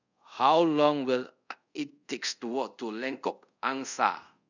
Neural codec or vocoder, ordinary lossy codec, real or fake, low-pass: codec, 24 kHz, 0.5 kbps, DualCodec; none; fake; 7.2 kHz